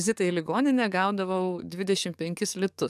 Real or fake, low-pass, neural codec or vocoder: fake; 14.4 kHz; codec, 44.1 kHz, 7.8 kbps, DAC